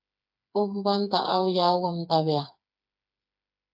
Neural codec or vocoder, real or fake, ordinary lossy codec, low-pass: codec, 16 kHz, 4 kbps, FreqCodec, smaller model; fake; AAC, 48 kbps; 5.4 kHz